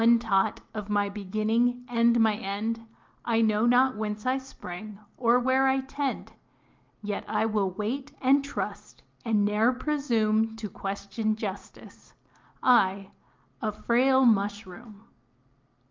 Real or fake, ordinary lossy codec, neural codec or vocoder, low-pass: real; Opus, 24 kbps; none; 7.2 kHz